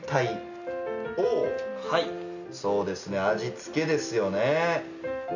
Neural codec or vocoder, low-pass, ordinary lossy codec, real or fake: none; 7.2 kHz; none; real